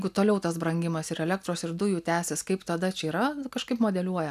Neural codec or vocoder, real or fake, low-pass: none; real; 14.4 kHz